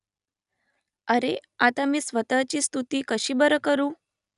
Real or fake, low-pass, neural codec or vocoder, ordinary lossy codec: real; 10.8 kHz; none; none